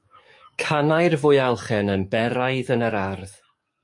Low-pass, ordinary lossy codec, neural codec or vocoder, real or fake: 10.8 kHz; MP3, 48 kbps; codec, 44.1 kHz, 7.8 kbps, DAC; fake